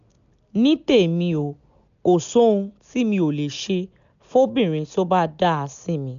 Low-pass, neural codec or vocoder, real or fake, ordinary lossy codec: 7.2 kHz; none; real; none